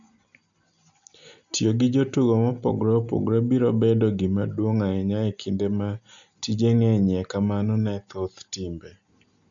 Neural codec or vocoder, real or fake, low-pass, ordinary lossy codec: none; real; 7.2 kHz; none